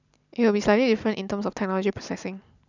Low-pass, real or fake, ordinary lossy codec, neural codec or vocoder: 7.2 kHz; real; none; none